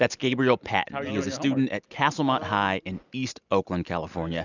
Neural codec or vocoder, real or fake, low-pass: none; real; 7.2 kHz